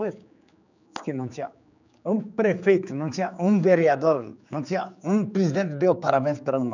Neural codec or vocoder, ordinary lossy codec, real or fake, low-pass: codec, 16 kHz, 4 kbps, X-Codec, HuBERT features, trained on general audio; none; fake; 7.2 kHz